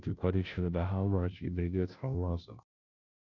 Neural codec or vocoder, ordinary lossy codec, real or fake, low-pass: codec, 16 kHz, 0.5 kbps, FunCodec, trained on Chinese and English, 25 frames a second; none; fake; 7.2 kHz